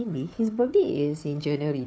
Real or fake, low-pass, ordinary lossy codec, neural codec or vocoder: fake; none; none; codec, 16 kHz, 4 kbps, FunCodec, trained on Chinese and English, 50 frames a second